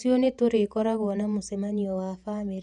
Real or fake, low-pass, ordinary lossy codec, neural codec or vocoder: fake; 10.8 kHz; none; vocoder, 48 kHz, 128 mel bands, Vocos